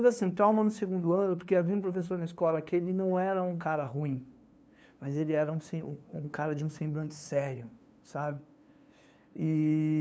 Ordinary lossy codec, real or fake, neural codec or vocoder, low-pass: none; fake; codec, 16 kHz, 2 kbps, FunCodec, trained on LibriTTS, 25 frames a second; none